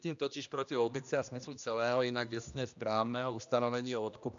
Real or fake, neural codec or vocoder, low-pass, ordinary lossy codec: fake; codec, 16 kHz, 1 kbps, X-Codec, HuBERT features, trained on general audio; 7.2 kHz; MP3, 64 kbps